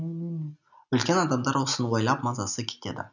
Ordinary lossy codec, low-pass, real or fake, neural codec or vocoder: none; 7.2 kHz; real; none